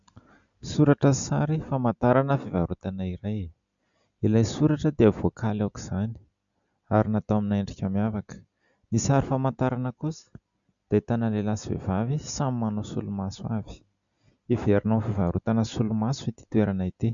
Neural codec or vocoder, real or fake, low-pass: none; real; 7.2 kHz